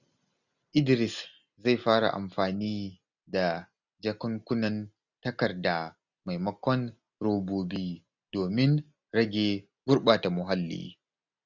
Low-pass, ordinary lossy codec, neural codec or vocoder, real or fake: 7.2 kHz; none; none; real